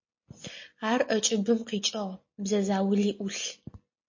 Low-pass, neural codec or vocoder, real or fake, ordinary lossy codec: 7.2 kHz; none; real; MP3, 32 kbps